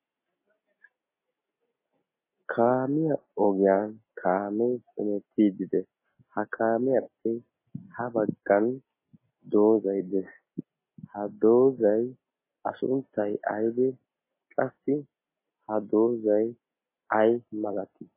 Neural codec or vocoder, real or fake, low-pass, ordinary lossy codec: none; real; 3.6 kHz; MP3, 16 kbps